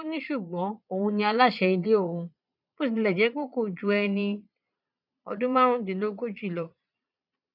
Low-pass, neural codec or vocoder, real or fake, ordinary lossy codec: 5.4 kHz; vocoder, 22.05 kHz, 80 mel bands, Vocos; fake; none